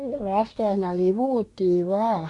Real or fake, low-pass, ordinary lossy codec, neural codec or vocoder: fake; 10.8 kHz; AAC, 48 kbps; codec, 24 kHz, 1 kbps, SNAC